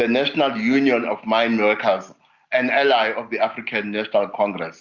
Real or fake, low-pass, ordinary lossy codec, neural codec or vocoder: real; 7.2 kHz; Opus, 64 kbps; none